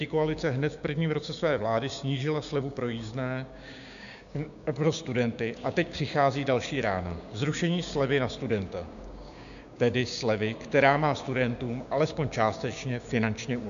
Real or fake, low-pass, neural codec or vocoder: fake; 7.2 kHz; codec, 16 kHz, 6 kbps, DAC